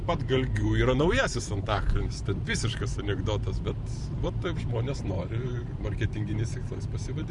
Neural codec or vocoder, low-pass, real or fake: vocoder, 44.1 kHz, 128 mel bands every 512 samples, BigVGAN v2; 10.8 kHz; fake